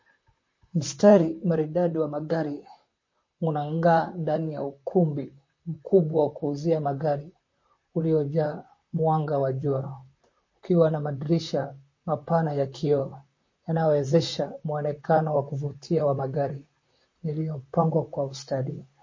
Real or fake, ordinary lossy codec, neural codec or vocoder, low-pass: fake; MP3, 32 kbps; vocoder, 44.1 kHz, 128 mel bands, Pupu-Vocoder; 7.2 kHz